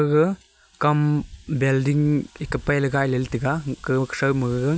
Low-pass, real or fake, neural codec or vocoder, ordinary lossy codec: none; real; none; none